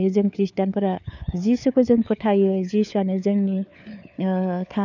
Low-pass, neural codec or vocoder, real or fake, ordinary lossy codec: 7.2 kHz; codec, 16 kHz, 4 kbps, FunCodec, trained on LibriTTS, 50 frames a second; fake; none